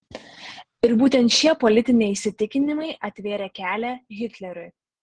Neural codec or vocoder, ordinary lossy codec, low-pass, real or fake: none; Opus, 16 kbps; 9.9 kHz; real